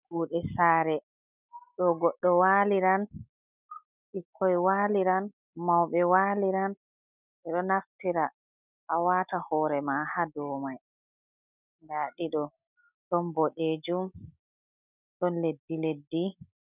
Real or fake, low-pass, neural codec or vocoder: real; 3.6 kHz; none